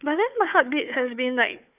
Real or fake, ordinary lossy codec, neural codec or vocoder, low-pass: fake; none; codec, 16 kHz, 4 kbps, FunCodec, trained on Chinese and English, 50 frames a second; 3.6 kHz